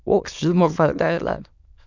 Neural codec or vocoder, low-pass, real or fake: autoencoder, 22.05 kHz, a latent of 192 numbers a frame, VITS, trained on many speakers; 7.2 kHz; fake